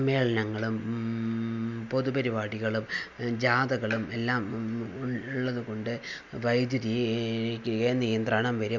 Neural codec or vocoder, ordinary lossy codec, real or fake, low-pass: none; none; real; 7.2 kHz